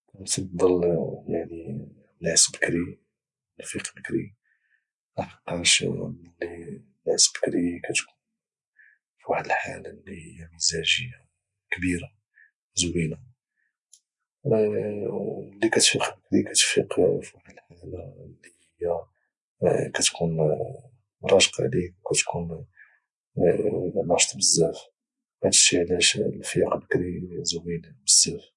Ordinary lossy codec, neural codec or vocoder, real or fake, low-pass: MP3, 96 kbps; none; real; 10.8 kHz